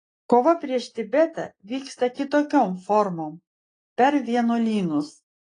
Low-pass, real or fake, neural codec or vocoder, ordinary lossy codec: 9.9 kHz; real; none; AAC, 32 kbps